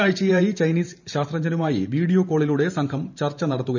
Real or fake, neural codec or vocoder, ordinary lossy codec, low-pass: fake; vocoder, 44.1 kHz, 128 mel bands every 512 samples, BigVGAN v2; none; 7.2 kHz